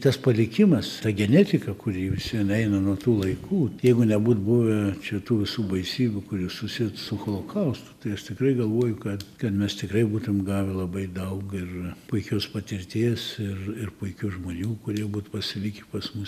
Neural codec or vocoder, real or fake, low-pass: none; real; 14.4 kHz